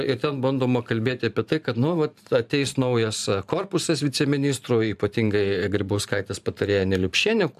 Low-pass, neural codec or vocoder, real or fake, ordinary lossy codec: 14.4 kHz; vocoder, 44.1 kHz, 128 mel bands every 512 samples, BigVGAN v2; fake; AAC, 96 kbps